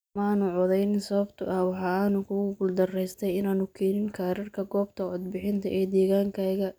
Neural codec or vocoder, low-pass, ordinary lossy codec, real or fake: none; none; none; real